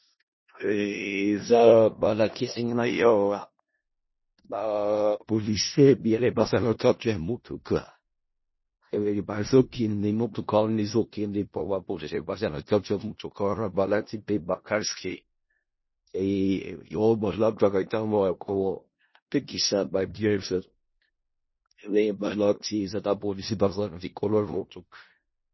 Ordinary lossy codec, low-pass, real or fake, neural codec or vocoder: MP3, 24 kbps; 7.2 kHz; fake; codec, 16 kHz in and 24 kHz out, 0.4 kbps, LongCat-Audio-Codec, four codebook decoder